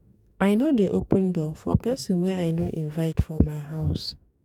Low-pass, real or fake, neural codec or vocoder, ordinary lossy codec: 19.8 kHz; fake; codec, 44.1 kHz, 2.6 kbps, DAC; none